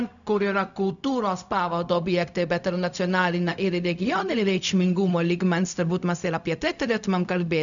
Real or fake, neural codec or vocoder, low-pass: fake; codec, 16 kHz, 0.4 kbps, LongCat-Audio-Codec; 7.2 kHz